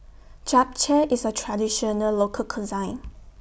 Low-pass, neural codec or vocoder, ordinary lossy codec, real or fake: none; none; none; real